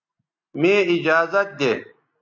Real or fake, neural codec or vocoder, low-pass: real; none; 7.2 kHz